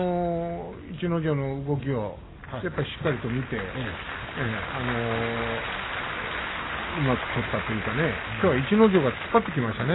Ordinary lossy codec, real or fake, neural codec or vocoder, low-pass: AAC, 16 kbps; real; none; 7.2 kHz